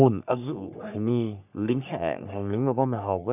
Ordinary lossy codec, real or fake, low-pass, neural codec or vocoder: none; fake; 3.6 kHz; codec, 44.1 kHz, 3.4 kbps, Pupu-Codec